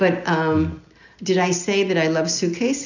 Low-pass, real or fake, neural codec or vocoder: 7.2 kHz; real; none